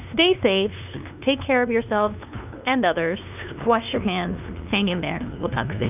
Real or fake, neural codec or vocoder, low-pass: fake; codec, 16 kHz, 2 kbps, FunCodec, trained on LibriTTS, 25 frames a second; 3.6 kHz